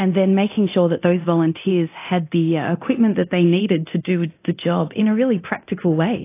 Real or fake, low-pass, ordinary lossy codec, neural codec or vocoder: fake; 3.6 kHz; AAC, 24 kbps; codec, 24 kHz, 0.9 kbps, DualCodec